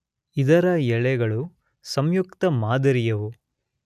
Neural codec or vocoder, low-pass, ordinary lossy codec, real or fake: none; 14.4 kHz; none; real